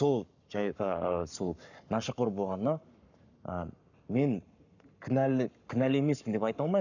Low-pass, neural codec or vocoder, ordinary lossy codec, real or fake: 7.2 kHz; codec, 44.1 kHz, 7.8 kbps, Pupu-Codec; none; fake